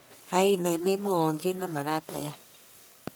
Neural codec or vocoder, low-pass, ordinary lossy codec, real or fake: codec, 44.1 kHz, 1.7 kbps, Pupu-Codec; none; none; fake